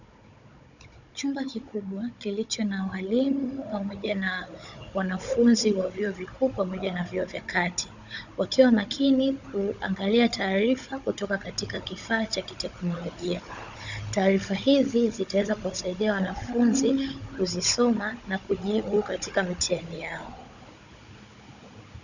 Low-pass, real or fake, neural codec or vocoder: 7.2 kHz; fake; codec, 16 kHz, 16 kbps, FunCodec, trained on Chinese and English, 50 frames a second